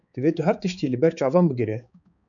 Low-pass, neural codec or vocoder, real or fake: 7.2 kHz; codec, 16 kHz, 4 kbps, X-Codec, HuBERT features, trained on balanced general audio; fake